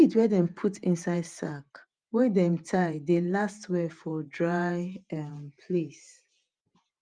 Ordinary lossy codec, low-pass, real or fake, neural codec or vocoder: Opus, 32 kbps; 9.9 kHz; fake; vocoder, 48 kHz, 128 mel bands, Vocos